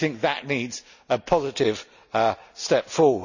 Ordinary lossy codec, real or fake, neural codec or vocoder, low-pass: none; real; none; 7.2 kHz